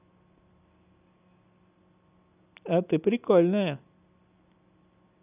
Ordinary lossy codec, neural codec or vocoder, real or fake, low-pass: none; none; real; 3.6 kHz